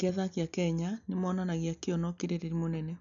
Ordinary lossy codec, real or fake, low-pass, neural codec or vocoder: none; real; 7.2 kHz; none